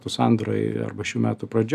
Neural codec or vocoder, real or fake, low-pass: none; real; 14.4 kHz